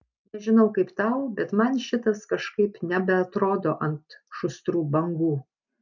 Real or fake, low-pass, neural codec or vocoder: real; 7.2 kHz; none